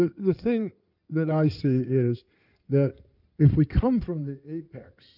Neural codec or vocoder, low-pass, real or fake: codec, 16 kHz in and 24 kHz out, 2.2 kbps, FireRedTTS-2 codec; 5.4 kHz; fake